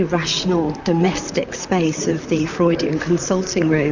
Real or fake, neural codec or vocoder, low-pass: fake; vocoder, 44.1 kHz, 128 mel bands, Pupu-Vocoder; 7.2 kHz